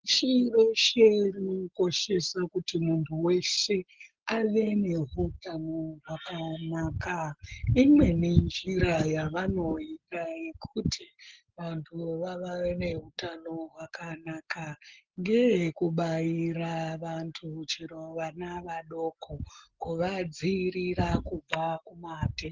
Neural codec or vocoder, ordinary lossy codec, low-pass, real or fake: none; Opus, 16 kbps; 7.2 kHz; real